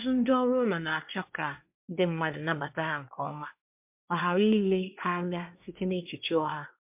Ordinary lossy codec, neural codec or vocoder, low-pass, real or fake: MP3, 32 kbps; codec, 24 kHz, 1 kbps, SNAC; 3.6 kHz; fake